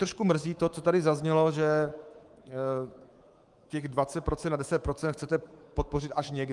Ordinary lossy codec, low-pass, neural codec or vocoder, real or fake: Opus, 24 kbps; 10.8 kHz; codec, 24 kHz, 3.1 kbps, DualCodec; fake